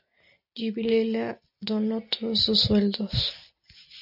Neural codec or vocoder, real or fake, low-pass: none; real; 5.4 kHz